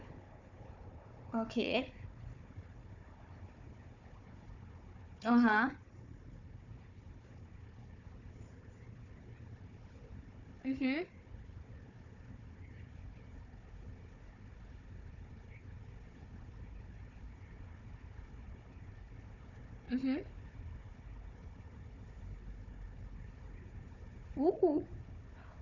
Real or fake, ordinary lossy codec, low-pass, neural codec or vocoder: fake; none; 7.2 kHz; codec, 16 kHz, 4 kbps, FunCodec, trained on Chinese and English, 50 frames a second